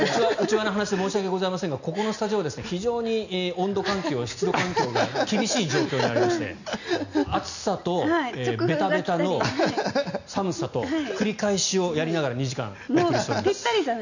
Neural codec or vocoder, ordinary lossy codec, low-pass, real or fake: none; none; 7.2 kHz; real